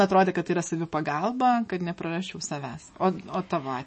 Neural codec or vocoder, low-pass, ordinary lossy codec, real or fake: autoencoder, 48 kHz, 128 numbers a frame, DAC-VAE, trained on Japanese speech; 10.8 kHz; MP3, 32 kbps; fake